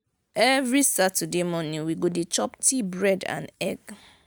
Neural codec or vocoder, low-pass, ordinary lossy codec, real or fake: none; none; none; real